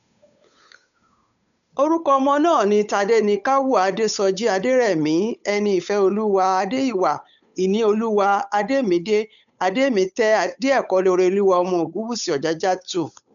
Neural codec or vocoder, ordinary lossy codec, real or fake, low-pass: codec, 16 kHz, 8 kbps, FunCodec, trained on Chinese and English, 25 frames a second; none; fake; 7.2 kHz